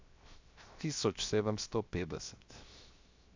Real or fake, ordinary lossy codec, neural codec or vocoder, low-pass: fake; MP3, 64 kbps; codec, 16 kHz, 0.7 kbps, FocalCodec; 7.2 kHz